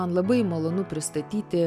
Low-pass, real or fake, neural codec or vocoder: 14.4 kHz; real; none